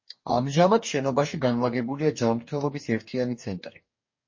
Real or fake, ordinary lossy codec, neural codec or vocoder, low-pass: fake; MP3, 32 kbps; codec, 44.1 kHz, 2.6 kbps, DAC; 7.2 kHz